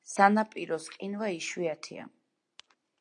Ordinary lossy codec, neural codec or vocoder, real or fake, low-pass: AAC, 64 kbps; none; real; 9.9 kHz